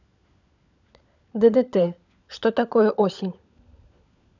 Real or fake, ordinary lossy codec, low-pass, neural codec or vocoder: fake; none; 7.2 kHz; codec, 16 kHz, 16 kbps, FunCodec, trained on LibriTTS, 50 frames a second